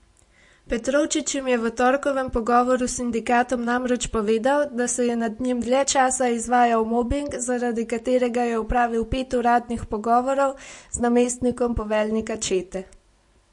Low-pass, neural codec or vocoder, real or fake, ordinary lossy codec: 10.8 kHz; none; real; MP3, 48 kbps